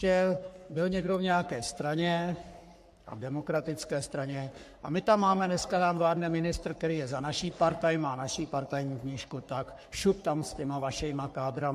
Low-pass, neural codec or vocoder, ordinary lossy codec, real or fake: 14.4 kHz; codec, 44.1 kHz, 3.4 kbps, Pupu-Codec; MP3, 64 kbps; fake